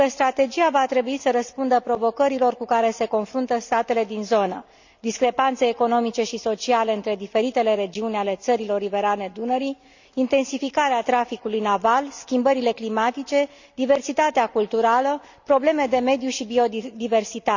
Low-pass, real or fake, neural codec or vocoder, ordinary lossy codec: 7.2 kHz; real; none; none